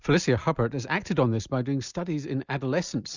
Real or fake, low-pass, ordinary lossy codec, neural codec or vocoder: real; 7.2 kHz; Opus, 64 kbps; none